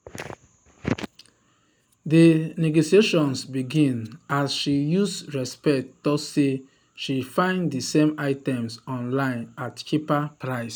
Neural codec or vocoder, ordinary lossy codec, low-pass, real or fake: none; none; none; real